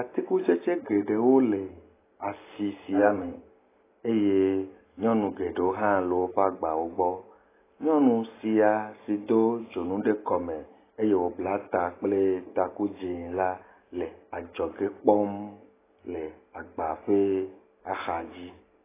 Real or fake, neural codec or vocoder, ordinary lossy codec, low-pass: real; none; AAC, 16 kbps; 3.6 kHz